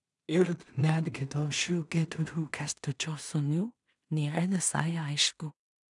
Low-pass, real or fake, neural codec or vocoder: 10.8 kHz; fake; codec, 16 kHz in and 24 kHz out, 0.4 kbps, LongCat-Audio-Codec, two codebook decoder